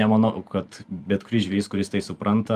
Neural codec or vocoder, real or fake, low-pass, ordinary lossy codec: vocoder, 44.1 kHz, 128 mel bands every 512 samples, BigVGAN v2; fake; 14.4 kHz; Opus, 32 kbps